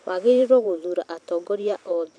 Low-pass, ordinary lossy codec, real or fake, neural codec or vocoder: 9.9 kHz; none; fake; vocoder, 44.1 kHz, 128 mel bands every 512 samples, BigVGAN v2